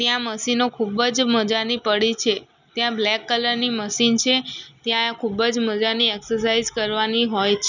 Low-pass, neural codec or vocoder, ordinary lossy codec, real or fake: 7.2 kHz; none; none; real